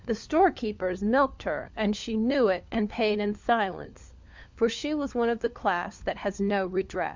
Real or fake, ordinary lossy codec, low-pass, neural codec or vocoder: fake; MP3, 64 kbps; 7.2 kHz; codec, 16 kHz, 4 kbps, FunCodec, trained on LibriTTS, 50 frames a second